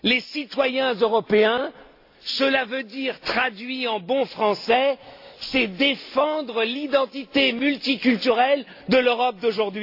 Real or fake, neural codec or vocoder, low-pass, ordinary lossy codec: real; none; 5.4 kHz; AAC, 32 kbps